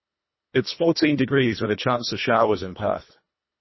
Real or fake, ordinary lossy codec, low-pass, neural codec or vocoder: fake; MP3, 24 kbps; 7.2 kHz; codec, 24 kHz, 1.5 kbps, HILCodec